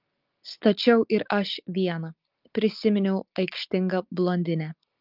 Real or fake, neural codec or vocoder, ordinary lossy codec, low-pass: real; none; Opus, 24 kbps; 5.4 kHz